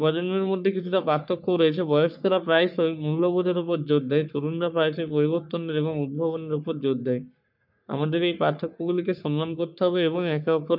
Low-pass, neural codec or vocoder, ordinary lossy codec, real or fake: 5.4 kHz; codec, 44.1 kHz, 3.4 kbps, Pupu-Codec; none; fake